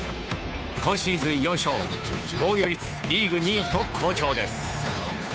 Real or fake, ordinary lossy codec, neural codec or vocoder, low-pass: fake; none; codec, 16 kHz, 2 kbps, FunCodec, trained on Chinese and English, 25 frames a second; none